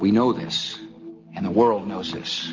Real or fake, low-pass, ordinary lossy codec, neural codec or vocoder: real; 7.2 kHz; Opus, 24 kbps; none